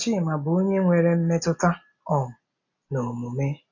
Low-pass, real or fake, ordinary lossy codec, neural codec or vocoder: 7.2 kHz; real; MP3, 48 kbps; none